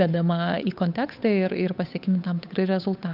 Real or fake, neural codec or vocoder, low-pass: fake; codec, 16 kHz, 8 kbps, FunCodec, trained on Chinese and English, 25 frames a second; 5.4 kHz